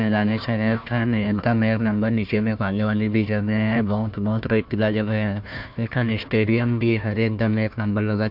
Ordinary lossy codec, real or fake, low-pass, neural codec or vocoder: MP3, 48 kbps; fake; 5.4 kHz; codec, 16 kHz, 1 kbps, FunCodec, trained on Chinese and English, 50 frames a second